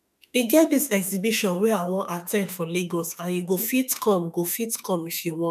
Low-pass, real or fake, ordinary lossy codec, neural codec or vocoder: 14.4 kHz; fake; none; autoencoder, 48 kHz, 32 numbers a frame, DAC-VAE, trained on Japanese speech